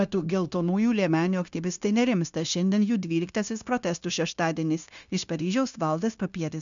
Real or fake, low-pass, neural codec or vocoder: fake; 7.2 kHz; codec, 16 kHz, 0.9 kbps, LongCat-Audio-Codec